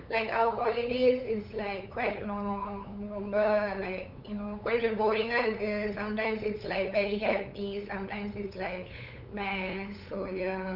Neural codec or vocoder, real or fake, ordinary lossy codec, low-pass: codec, 16 kHz, 8 kbps, FunCodec, trained on LibriTTS, 25 frames a second; fake; none; 5.4 kHz